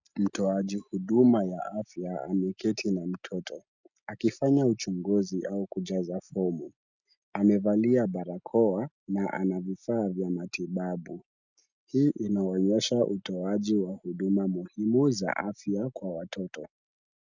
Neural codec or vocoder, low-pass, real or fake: none; 7.2 kHz; real